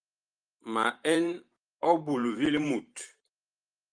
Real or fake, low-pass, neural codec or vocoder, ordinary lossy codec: fake; 9.9 kHz; vocoder, 44.1 kHz, 128 mel bands every 512 samples, BigVGAN v2; Opus, 32 kbps